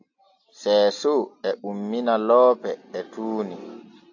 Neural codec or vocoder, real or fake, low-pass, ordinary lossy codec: none; real; 7.2 kHz; AAC, 48 kbps